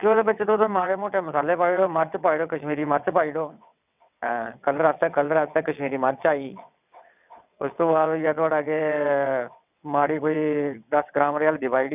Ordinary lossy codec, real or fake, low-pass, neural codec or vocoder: none; fake; 3.6 kHz; vocoder, 22.05 kHz, 80 mel bands, WaveNeXt